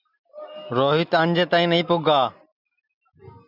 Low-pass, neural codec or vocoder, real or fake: 5.4 kHz; none; real